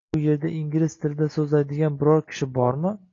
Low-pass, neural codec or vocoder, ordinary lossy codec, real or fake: 7.2 kHz; none; AAC, 32 kbps; real